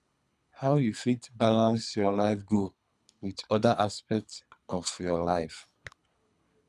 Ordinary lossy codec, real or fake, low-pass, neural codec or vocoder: none; fake; none; codec, 24 kHz, 3 kbps, HILCodec